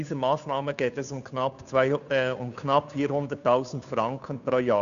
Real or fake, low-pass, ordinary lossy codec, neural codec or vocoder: fake; 7.2 kHz; none; codec, 16 kHz, 2 kbps, FunCodec, trained on Chinese and English, 25 frames a second